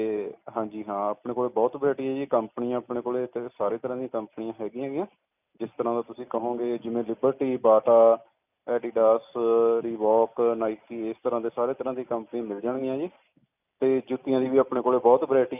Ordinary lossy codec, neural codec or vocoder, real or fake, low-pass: none; none; real; 3.6 kHz